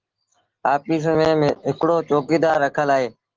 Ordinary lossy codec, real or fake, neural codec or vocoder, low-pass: Opus, 16 kbps; real; none; 7.2 kHz